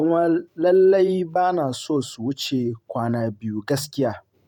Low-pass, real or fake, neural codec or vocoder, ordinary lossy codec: 19.8 kHz; fake; vocoder, 44.1 kHz, 128 mel bands every 512 samples, BigVGAN v2; none